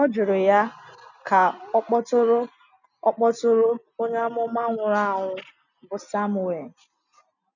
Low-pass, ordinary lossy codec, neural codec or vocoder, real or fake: 7.2 kHz; none; none; real